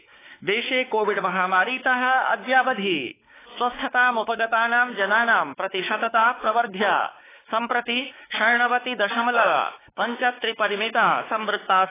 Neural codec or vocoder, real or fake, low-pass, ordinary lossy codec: codec, 16 kHz, 4 kbps, X-Codec, WavLM features, trained on Multilingual LibriSpeech; fake; 3.6 kHz; AAC, 16 kbps